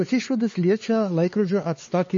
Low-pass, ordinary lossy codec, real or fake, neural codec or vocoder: 7.2 kHz; MP3, 32 kbps; fake; codec, 16 kHz, 4 kbps, X-Codec, HuBERT features, trained on LibriSpeech